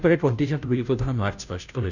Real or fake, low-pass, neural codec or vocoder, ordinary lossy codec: fake; 7.2 kHz; codec, 16 kHz, 0.5 kbps, FunCodec, trained on Chinese and English, 25 frames a second; none